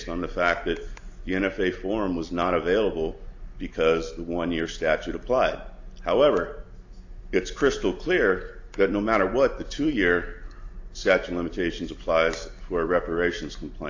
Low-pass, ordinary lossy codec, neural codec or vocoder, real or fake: 7.2 kHz; AAC, 48 kbps; none; real